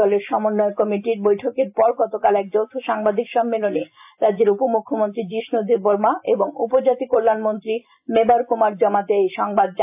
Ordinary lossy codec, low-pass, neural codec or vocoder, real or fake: AAC, 32 kbps; 3.6 kHz; none; real